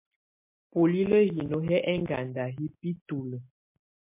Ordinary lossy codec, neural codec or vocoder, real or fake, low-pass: MP3, 24 kbps; none; real; 3.6 kHz